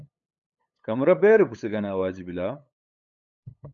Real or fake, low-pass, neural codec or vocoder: fake; 7.2 kHz; codec, 16 kHz, 8 kbps, FunCodec, trained on LibriTTS, 25 frames a second